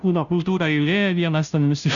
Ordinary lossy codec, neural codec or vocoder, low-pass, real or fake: MP3, 96 kbps; codec, 16 kHz, 0.5 kbps, FunCodec, trained on Chinese and English, 25 frames a second; 7.2 kHz; fake